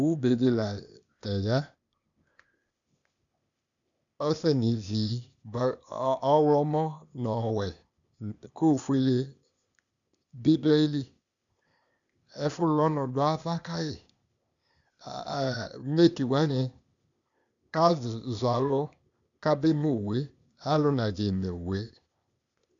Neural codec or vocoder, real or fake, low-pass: codec, 16 kHz, 0.8 kbps, ZipCodec; fake; 7.2 kHz